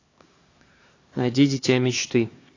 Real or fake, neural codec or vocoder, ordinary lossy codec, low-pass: fake; codec, 16 kHz, 2 kbps, X-Codec, HuBERT features, trained on LibriSpeech; AAC, 32 kbps; 7.2 kHz